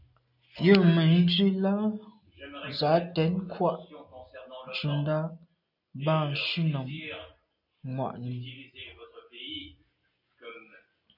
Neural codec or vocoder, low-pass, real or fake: none; 5.4 kHz; real